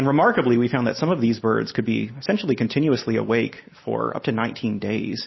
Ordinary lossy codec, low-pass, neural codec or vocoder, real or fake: MP3, 24 kbps; 7.2 kHz; none; real